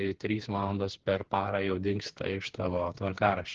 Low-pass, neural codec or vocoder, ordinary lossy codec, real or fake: 7.2 kHz; codec, 16 kHz, 4 kbps, FreqCodec, smaller model; Opus, 16 kbps; fake